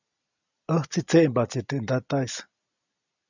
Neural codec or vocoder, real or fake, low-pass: none; real; 7.2 kHz